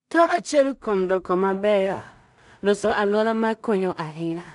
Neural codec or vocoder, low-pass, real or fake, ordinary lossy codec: codec, 16 kHz in and 24 kHz out, 0.4 kbps, LongCat-Audio-Codec, two codebook decoder; 10.8 kHz; fake; none